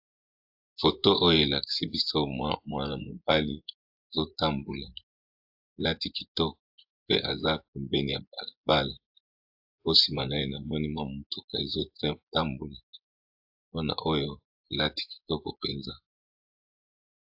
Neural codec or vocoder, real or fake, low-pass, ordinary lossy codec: vocoder, 24 kHz, 100 mel bands, Vocos; fake; 5.4 kHz; AAC, 48 kbps